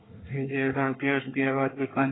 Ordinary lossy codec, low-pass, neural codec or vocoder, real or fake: AAC, 16 kbps; 7.2 kHz; codec, 24 kHz, 1 kbps, SNAC; fake